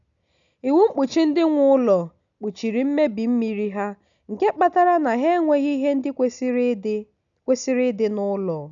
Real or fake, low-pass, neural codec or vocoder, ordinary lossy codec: real; 7.2 kHz; none; none